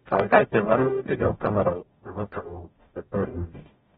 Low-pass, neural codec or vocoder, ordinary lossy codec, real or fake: 19.8 kHz; codec, 44.1 kHz, 0.9 kbps, DAC; AAC, 16 kbps; fake